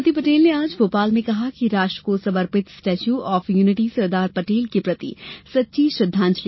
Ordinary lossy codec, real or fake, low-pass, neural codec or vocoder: MP3, 24 kbps; real; 7.2 kHz; none